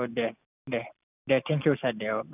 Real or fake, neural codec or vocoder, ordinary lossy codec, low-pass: real; none; none; 3.6 kHz